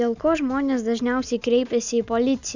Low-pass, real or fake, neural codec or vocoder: 7.2 kHz; real; none